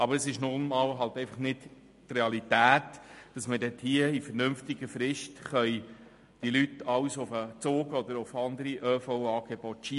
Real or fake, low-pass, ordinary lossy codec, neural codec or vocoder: real; 14.4 kHz; MP3, 48 kbps; none